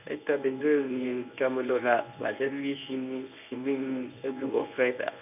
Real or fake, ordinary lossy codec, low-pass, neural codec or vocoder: fake; none; 3.6 kHz; codec, 24 kHz, 0.9 kbps, WavTokenizer, medium speech release version 1